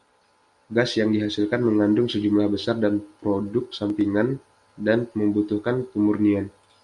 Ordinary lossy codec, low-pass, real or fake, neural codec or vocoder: Opus, 64 kbps; 10.8 kHz; real; none